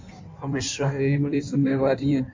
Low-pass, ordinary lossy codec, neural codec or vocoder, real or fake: 7.2 kHz; MP3, 48 kbps; codec, 16 kHz in and 24 kHz out, 1.1 kbps, FireRedTTS-2 codec; fake